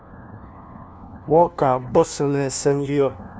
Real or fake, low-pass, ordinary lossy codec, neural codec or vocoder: fake; none; none; codec, 16 kHz, 1 kbps, FunCodec, trained on LibriTTS, 50 frames a second